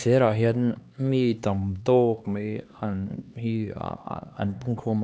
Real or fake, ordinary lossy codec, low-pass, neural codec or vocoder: fake; none; none; codec, 16 kHz, 2 kbps, X-Codec, HuBERT features, trained on LibriSpeech